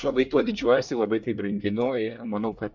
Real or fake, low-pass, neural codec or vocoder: fake; 7.2 kHz; codec, 24 kHz, 1 kbps, SNAC